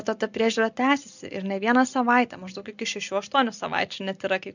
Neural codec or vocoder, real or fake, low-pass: none; real; 7.2 kHz